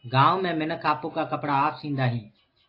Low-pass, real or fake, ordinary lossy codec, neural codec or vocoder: 5.4 kHz; real; AAC, 32 kbps; none